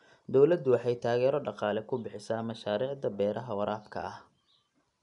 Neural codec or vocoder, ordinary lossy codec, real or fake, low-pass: none; none; real; 10.8 kHz